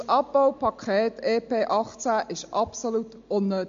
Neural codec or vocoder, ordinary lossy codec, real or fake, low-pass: none; MP3, 48 kbps; real; 7.2 kHz